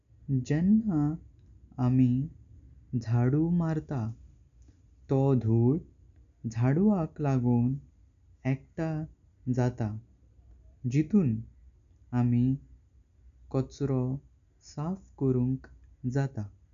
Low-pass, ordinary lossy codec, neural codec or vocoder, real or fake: 7.2 kHz; none; none; real